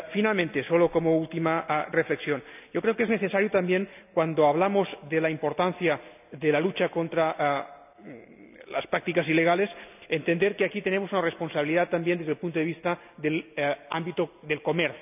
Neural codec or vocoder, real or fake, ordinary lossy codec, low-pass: none; real; none; 3.6 kHz